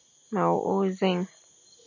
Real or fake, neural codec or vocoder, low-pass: real; none; 7.2 kHz